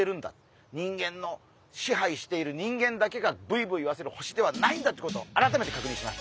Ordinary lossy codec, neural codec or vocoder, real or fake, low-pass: none; none; real; none